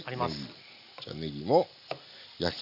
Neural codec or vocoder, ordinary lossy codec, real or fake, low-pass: none; none; real; 5.4 kHz